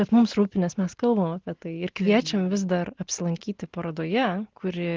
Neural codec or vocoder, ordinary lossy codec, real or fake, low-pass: none; Opus, 16 kbps; real; 7.2 kHz